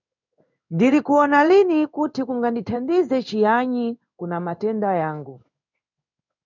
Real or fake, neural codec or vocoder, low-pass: fake; codec, 16 kHz in and 24 kHz out, 1 kbps, XY-Tokenizer; 7.2 kHz